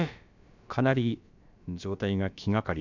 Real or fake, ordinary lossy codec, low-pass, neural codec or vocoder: fake; none; 7.2 kHz; codec, 16 kHz, about 1 kbps, DyCAST, with the encoder's durations